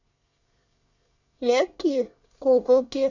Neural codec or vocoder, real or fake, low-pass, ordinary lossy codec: codec, 24 kHz, 1 kbps, SNAC; fake; 7.2 kHz; none